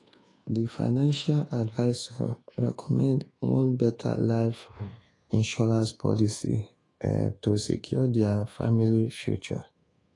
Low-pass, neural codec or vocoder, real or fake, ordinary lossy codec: 10.8 kHz; codec, 24 kHz, 1.2 kbps, DualCodec; fake; AAC, 32 kbps